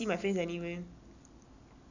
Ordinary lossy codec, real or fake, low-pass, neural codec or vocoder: none; real; 7.2 kHz; none